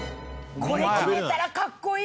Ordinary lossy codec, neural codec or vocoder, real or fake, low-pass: none; none; real; none